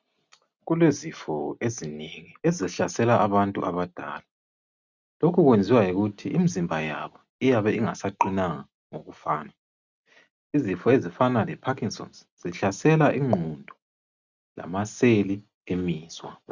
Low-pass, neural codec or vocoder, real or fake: 7.2 kHz; none; real